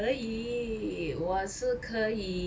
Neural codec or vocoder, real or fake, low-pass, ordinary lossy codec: none; real; none; none